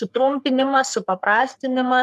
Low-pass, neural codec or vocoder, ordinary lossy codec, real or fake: 14.4 kHz; codec, 44.1 kHz, 3.4 kbps, Pupu-Codec; MP3, 96 kbps; fake